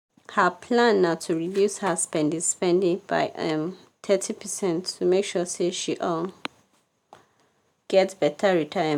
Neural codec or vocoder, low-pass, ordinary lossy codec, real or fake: none; 19.8 kHz; none; real